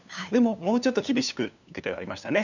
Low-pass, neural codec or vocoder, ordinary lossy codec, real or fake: 7.2 kHz; codec, 16 kHz, 2 kbps, FunCodec, trained on LibriTTS, 25 frames a second; none; fake